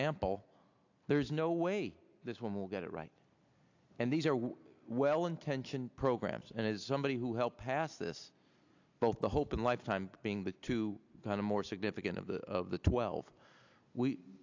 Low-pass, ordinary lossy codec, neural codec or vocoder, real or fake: 7.2 kHz; AAC, 48 kbps; none; real